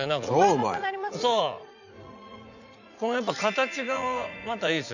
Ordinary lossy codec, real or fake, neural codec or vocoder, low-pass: none; fake; vocoder, 44.1 kHz, 80 mel bands, Vocos; 7.2 kHz